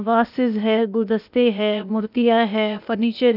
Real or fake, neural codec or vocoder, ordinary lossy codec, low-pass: fake; codec, 16 kHz, 0.8 kbps, ZipCodec; none; 5.4 kHz